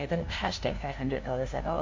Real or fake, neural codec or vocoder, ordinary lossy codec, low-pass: fake; codec, 16 kHz, 0.5 kbps, FunCodec, trained on LibriTTS, 25 frames a second; MP3, 48 kbps; 7.2 kHz